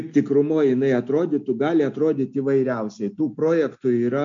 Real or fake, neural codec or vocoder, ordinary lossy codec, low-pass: real; none; MP3, 48 kbps; 7.2 kHz